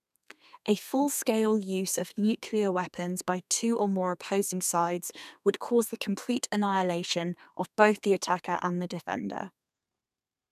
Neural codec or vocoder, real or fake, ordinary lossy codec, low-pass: codec, 32 kHz, 1.9 kbps, SNAC; fake; none; 14.4 kHz